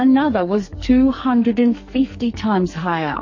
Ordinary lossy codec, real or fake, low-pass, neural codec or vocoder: MP3, 32 kbps; fake; 7.2 kHz; codec, 44.1 kHz, 2.6 kbps, SNAC